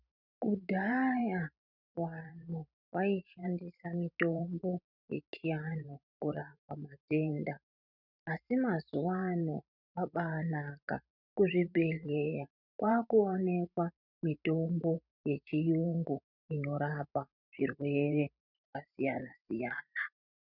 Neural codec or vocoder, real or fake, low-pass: vocoder, 44.1 kHz, 128 mel bands every 512 samples, BigVGAN v2; fake; 5.4 kHz